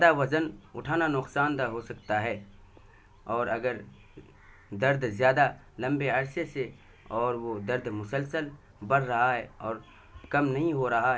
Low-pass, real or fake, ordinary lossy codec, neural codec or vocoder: none; real; none; none